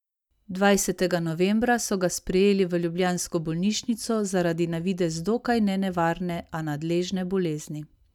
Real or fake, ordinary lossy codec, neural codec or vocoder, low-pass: real; none; none; 19.8 kHz